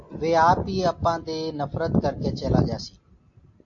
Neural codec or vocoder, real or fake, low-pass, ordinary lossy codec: none; real; 7.2 kHz; AAC, 48 kbps